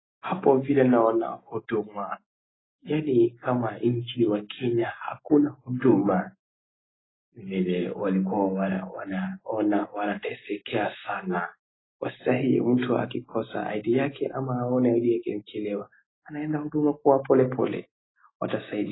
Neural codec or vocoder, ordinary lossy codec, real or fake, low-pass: none; AAC, 16 kbps; real; 7.2 kHz